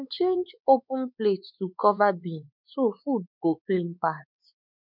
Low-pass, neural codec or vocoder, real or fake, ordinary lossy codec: 5.4 kHz; codec, 16 kHz, 4 kbps, X-Codec, WavLM features, trained on Multilingual LibriSpeech; fake; none